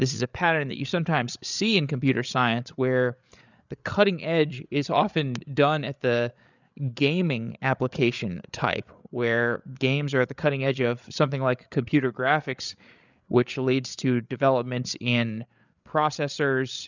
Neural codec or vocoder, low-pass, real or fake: codec, 16 kHz, 8 kbps, FreqCodec, larger model; 7.2 kHz; fake